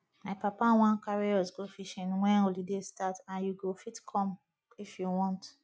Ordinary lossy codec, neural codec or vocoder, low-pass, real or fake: none; none; none; real